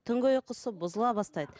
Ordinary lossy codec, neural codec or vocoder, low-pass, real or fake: none; none; none; real